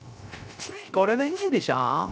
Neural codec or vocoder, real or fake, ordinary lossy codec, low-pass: codec, 16 kHz, 0.3 kbps, FocalCodec; fake; none; none